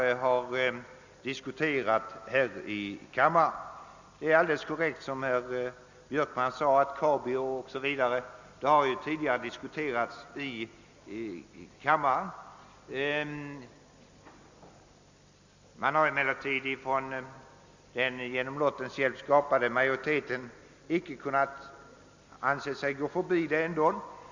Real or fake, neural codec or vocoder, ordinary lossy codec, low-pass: real; none; Opus, 64 kbps; 7.2 kHz